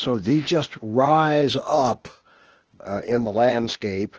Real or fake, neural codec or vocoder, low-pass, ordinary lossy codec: fake; codec, 16 kHz, 0.8 kbps, ZipCodec; 7.2 kHz; Opus, 16 kbps